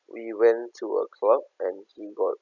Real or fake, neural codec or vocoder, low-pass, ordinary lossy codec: real; none; 7.2 kHz; none